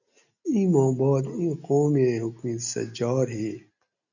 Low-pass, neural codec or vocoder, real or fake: 7.2 kHz; none; real